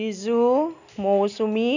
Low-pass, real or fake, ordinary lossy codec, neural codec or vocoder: 7.2 kHz; real; none; none